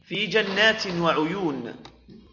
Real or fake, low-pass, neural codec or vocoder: real; 7.2 kHz; none